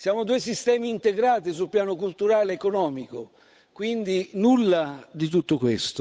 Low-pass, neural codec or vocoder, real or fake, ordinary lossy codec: none; codec, 16 kHz, 8 kbps, FunCodec, trained on Chinese and English, 25 frames a second; fake; none